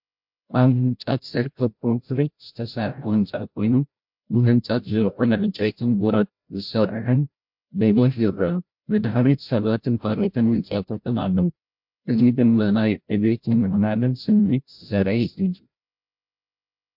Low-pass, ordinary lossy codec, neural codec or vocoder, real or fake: 5.4 kHz; MP3, 48 kbps; codec, 16 kHz, 0.5 kbps, FreqCodec, larger model; fake